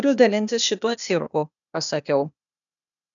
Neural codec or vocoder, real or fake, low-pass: codec, 16 kHz, 0.8 kbps, ZipCodec; fake; 7.2 kHz